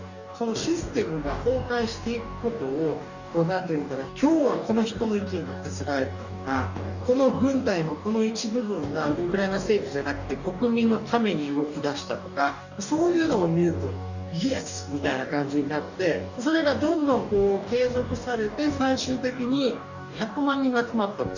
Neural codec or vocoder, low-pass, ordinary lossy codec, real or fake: codec, 44.1 kHz, 2.6 kbps, DAC; 7.2 kHz; none; fake